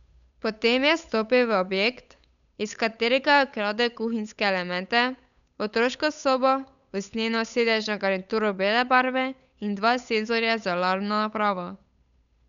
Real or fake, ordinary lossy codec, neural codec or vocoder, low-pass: fake; none; codec, 16 kHz, 8 kbps, FunCodec, trained on Chinese and English, 25 frames a second; 7.2 kHz